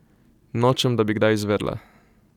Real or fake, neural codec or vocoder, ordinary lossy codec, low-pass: real; none; none; 19.8 kHz